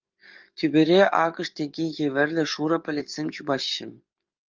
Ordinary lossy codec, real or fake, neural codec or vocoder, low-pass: Opus, 24 kbps; fake; codec, 44.1 kHz, 7.8 kbps, DAC; 7.2 kHz